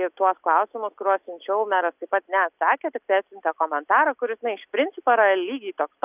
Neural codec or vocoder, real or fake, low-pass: none; real; 3.6 kHz